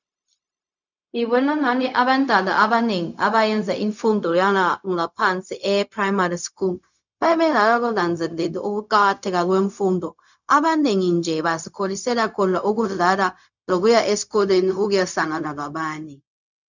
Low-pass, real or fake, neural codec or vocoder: 7.2 kHz; fake; codec, 16 kHz, 0.4 kbps, LongCat-Audio-Codec